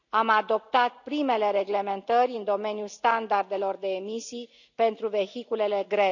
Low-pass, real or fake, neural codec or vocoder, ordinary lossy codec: 7.2 kHz; real; none; none